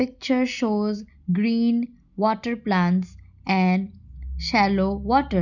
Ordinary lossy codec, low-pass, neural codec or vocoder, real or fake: none; 7.2 kHz; none; real